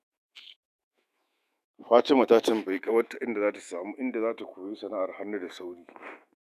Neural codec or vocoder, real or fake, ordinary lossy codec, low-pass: autoencoder, 48 kHz, 128 numbers a frame, DAC-VAE, trained on Japanese speech; fake; none; 14.4 kHz